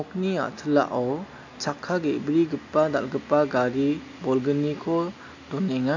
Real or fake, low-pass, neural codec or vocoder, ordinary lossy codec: real; 7.2 kHz; none; AAC, 48 kbps